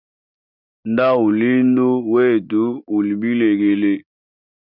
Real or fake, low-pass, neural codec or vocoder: real; 5.4 kHz; none